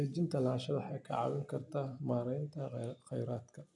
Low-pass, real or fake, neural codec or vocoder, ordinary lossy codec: 10.8 kHz; real; none; none